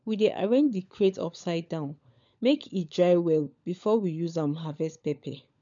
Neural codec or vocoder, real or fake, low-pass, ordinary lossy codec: codec, 16 kHz, 16 kbps, FunCodec, trained on LibriTTS, 50 frames a second; fake; 7.2 kHz; MP3, 64 kbps